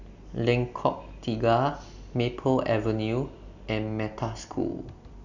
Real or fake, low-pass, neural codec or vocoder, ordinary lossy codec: real; 7.2 kHz; none; none